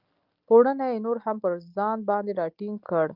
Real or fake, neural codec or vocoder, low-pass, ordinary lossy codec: real; none; 5.4 kHz; Opus, 24 kbps